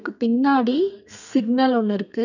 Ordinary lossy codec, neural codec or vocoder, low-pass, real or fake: none; codec, 32 kHz, 1.9 kbps, SNAC; 7.2 kHz; fake